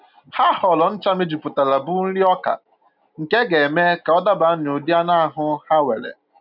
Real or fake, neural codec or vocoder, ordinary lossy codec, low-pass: real; none; none; 5.4 kHz